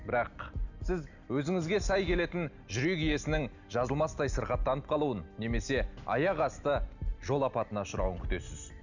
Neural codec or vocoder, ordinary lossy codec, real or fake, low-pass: none; none; real; 7.2 kHz